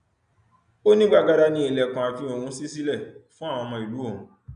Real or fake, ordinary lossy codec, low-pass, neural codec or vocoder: real; none; 9.9 kHz; none